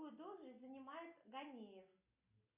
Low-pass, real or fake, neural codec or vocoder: 3.6 kHz; real; none